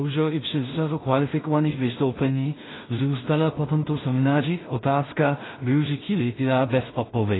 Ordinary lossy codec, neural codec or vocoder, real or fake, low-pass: AAC, 16 kbps; codec, 16 kHz in and 24 kHz out, 0.4 kbps, LongCat-Audio-Codec, two codebook decoder; fake; 7.2 kHz